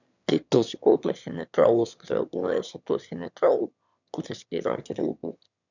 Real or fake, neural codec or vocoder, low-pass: fake; autoencoder, 22.05 kHz, a latent of 192 numbers a frame, VITS, trained on one speaker; 7.2 kHz